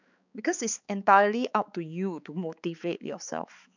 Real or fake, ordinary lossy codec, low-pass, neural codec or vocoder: fake; none; 7.2 kHz; codec, 16 kHz, 4 kbps, X-Codec, HuBERT features, trained on balanced general audio